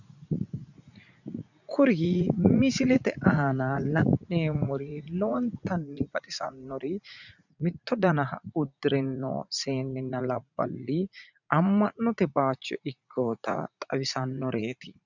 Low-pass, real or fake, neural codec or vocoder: 7.2 kHz; fake; vocoder, 44.1 kHz, 80 mel bands, Vocos